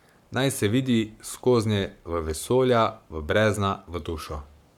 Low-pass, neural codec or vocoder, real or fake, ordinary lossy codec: 19.8 kHz; codec, 44.1 kHz, 7.8 kbps, Pupu-Codec; fake; none